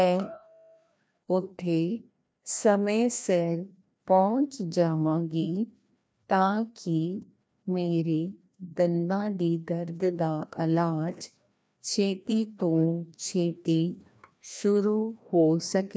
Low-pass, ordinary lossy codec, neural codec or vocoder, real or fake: none; none; codec, 16 kHz, 1 kbps, FreqCodec, larger model; fake